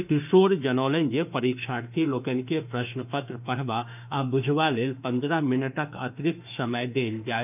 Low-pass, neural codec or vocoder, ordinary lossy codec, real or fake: 3.6 kHz; autoencoder, 48 kHz, 32 numbers a frame, DAC-VAE, trained on Japanese speech; none; fake